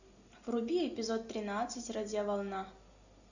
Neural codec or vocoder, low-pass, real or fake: none; 7.2 kHz; real